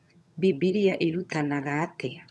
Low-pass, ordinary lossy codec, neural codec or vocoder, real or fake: none; none; vocoder, 22.05 kHz, 80 mel bands, HiFi-GAN; fake